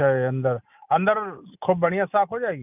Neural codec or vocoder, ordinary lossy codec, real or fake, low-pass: none; none; real; 3.6 kHz